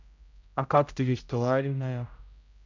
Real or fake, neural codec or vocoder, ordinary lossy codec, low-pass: fake; codec, 16 kHz, 0.5 kbps, X-Codec, HuBERT features, trained on general audio; none; 7.2 kHz